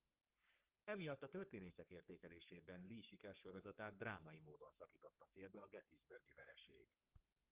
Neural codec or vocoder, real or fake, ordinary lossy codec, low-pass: codec, 44.1 kHz, 3.4 kbps, Pupu-Codec; fake; Opus, 32 kbps; 3.6 kHz